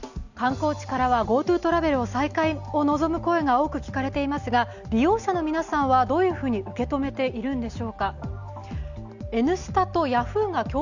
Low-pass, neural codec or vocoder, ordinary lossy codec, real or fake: 7.2 kHz; none; none; real